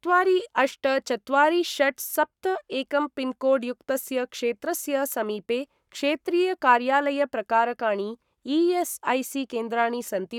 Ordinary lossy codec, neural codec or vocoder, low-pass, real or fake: none; codec, 44.1 kHz, 7.8 kbps, Pupu-Codec; 19.8 kHz; fake